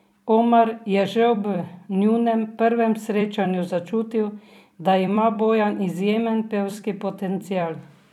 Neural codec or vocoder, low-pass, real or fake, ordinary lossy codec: vocoder, 44.1 kHz, 128 mel bands every 256 samples, BigVGAN v2; 19.8 kHz; fake; none